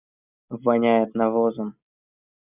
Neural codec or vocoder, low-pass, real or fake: none; 3.6 kHz; real